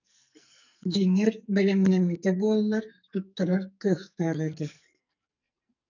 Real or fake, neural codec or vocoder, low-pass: fake; codec, 44.1 kHz, 2.6 kbps, SNAC; 7.2 kHz